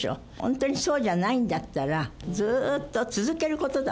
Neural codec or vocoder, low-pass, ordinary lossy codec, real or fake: none; none; none; real